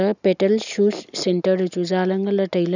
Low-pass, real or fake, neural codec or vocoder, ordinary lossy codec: 7.2 kHz; real; none; none